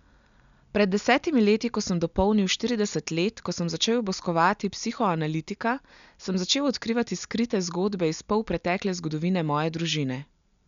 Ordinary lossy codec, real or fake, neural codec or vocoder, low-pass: none; real; none; 7.2 kHz